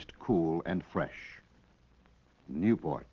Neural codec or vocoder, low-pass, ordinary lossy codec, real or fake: none; 7.2 kHz; Opus, 16 kbps; real